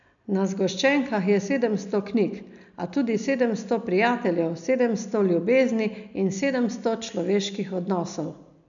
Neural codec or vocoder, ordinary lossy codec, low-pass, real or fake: none; none; 7.2 kHz; real